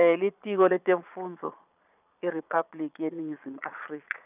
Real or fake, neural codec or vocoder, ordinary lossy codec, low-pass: fake; vocoder, 22.05 kHz, 80 mel bands, Vocos; none; 3.6 kHz